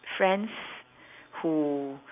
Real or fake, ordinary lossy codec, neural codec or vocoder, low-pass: real; none; none; 3.6 kHz